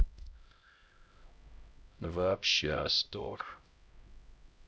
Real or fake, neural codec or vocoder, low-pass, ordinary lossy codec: fake; codec, 16 kHz, 0.5 kbps, X-Codec, HuBERT features, trained on LibriSpeech; none; none